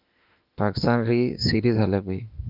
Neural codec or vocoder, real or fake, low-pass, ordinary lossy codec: autoencoder, 48 kHz, 32 numbers a frame, DAC-VAE, trained on Japanese speech; fake; 5.4 kHz; Opus, 24 kbps